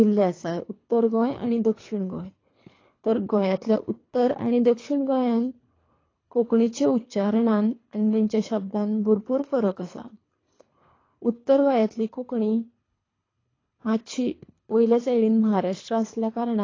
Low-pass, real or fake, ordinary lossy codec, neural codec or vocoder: 7.2 kHz; fake; AAC, 32 kbps; codec, 24 kHz, 3 kbps, HILCodec